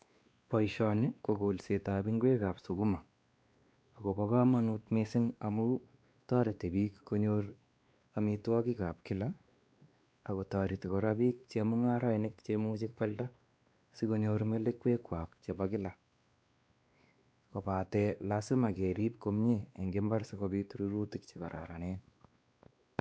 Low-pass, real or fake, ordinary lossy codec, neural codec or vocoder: none; fake; none; codec, 16 kHz, 2 kbps, X-Codec, WavLM features, trained on Multilingual LibriSpeech